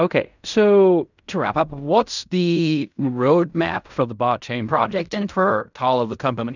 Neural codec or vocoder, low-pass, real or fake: codec, 16 kHz in and 24 kHz out, 0.4 kbps, LongCat-Audio-Codec, fine tuned four codebook decoder; 7.2 kHz; fake